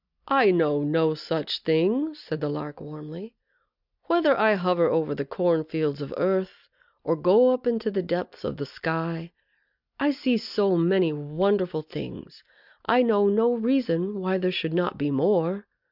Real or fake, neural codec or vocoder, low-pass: real; none; 5.4 kHz